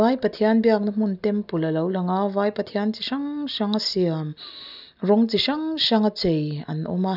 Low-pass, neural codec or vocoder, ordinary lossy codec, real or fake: 5.4 kHz; none; none; real